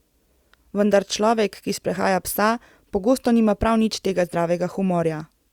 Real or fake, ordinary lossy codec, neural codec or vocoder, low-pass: real; Opus, 64 kbps; none; 19.8 kHz